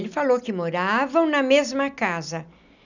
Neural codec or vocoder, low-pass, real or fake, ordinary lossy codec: none; 7.2 kHz; real; none